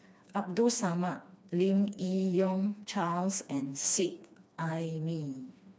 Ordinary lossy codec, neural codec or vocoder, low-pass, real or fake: none; codec, 16 kHz, 2 kbps, FreqCodec, smaller model; none; fake